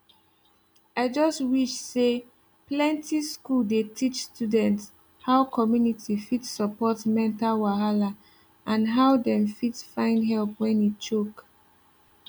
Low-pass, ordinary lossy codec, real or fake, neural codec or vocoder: none; none; real; none